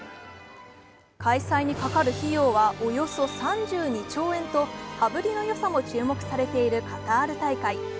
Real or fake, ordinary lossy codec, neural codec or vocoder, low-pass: real; none; none; none